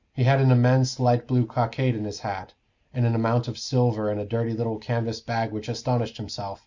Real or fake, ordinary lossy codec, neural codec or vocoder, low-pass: real; Opus, 64 kbps; none; 7.2 kHz